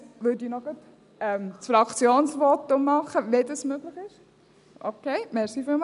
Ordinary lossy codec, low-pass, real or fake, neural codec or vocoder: none; 10.8 kHz; real; none